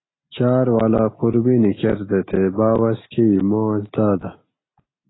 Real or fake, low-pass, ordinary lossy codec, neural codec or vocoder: real; 7.2 kHz; AAC, 16 kbps; none